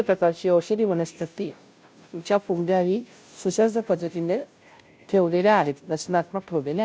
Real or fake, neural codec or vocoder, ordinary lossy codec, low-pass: fake; codec, 16 kHz, 0.5 kbps, FunCodec, trained on Chinese and English, 25 frames a second; none; none